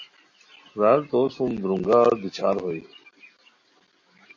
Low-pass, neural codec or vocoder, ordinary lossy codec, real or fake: 7.2 kHz; none; MP3, 32 kbps; real